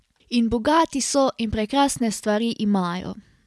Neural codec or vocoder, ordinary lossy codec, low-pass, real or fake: none; none; none; real